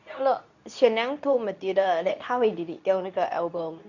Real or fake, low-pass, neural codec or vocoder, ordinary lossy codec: fake; 7.2 kHz; codec, 24 kHz, 0.9 kbps, WavTokenizer, medium speech release version 2; none